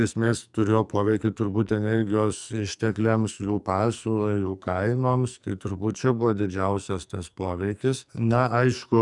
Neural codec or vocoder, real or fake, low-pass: codec, 44.1 kHz, 2.6 kbps, SNAC; fake; 10.8 kHz